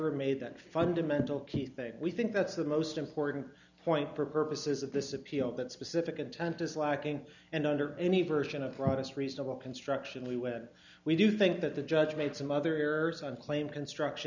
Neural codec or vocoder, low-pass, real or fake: none; 7.2 kHz; real